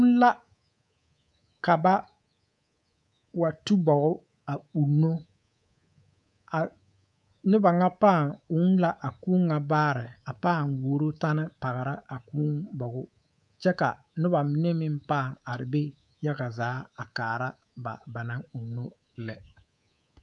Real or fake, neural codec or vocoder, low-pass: fake; codec, 24 kHz, 3.1 kbps, DualCodec; 10.8 kHz